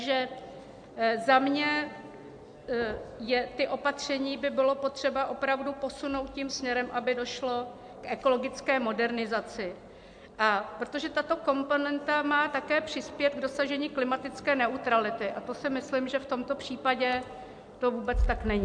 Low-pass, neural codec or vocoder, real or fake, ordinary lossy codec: 9.9 kHz; none; real; MP3, 64 kbps